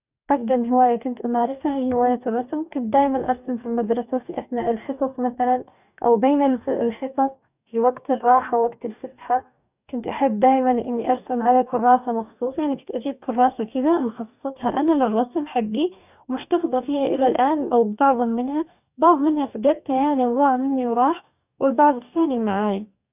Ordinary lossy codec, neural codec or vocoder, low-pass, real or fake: none; codec, 44.1 kHz, 2.6 kbps, DAC; 3.6 kHz; fake